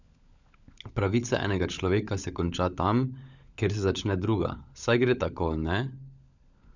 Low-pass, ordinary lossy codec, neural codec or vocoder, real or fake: 7.2 kHz; none; codec, 16 kHz, 16 kbps, FunCodec, trained on LibriTTS, 50 frames a second; fake